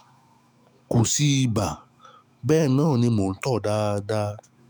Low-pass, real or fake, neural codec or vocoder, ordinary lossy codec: none; fake; autoencoder, 48 kHz, 128 numbers a frame, DAC-VAE, trained on Japanese speech; none